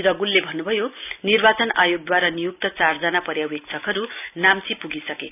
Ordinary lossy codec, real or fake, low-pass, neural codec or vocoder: none; real; 3.6 kHz; none